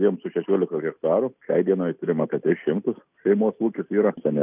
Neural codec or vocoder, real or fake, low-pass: none; real; 3.6 kHz